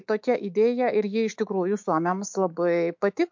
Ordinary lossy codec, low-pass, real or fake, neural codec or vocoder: MP3, 48 kbps; 7.2 kHz; fake; autoencoder, 48 kHz, 128 numbers a frame, DAC-VAE, trained on Japanese speech